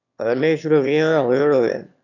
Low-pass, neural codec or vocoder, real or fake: 7.2 kHz; autoencoder, 22.05 kHz, a latent of 192 numbers a frame, VITS, trained on one speaker; fake